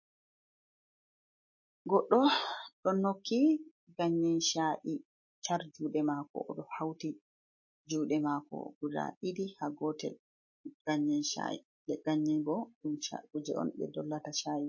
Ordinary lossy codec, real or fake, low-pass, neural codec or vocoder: MP3, 32 kbps; real; 7.2 kHz; none